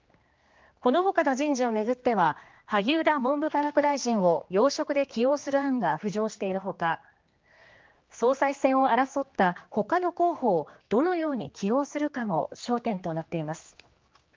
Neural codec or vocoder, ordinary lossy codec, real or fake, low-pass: codec, 16 kHz, 2 kbps, X-Codec, HuBERT features, trained on general audio; Opus, 32 kbps; fake; 7.2 kHz